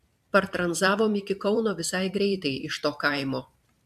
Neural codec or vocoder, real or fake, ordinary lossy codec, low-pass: vocoder, 44.1 kHz, 128 mel bands every 512 samples, BigVGAN v2; fake; MP3, 96 kbps; 14.4 kHz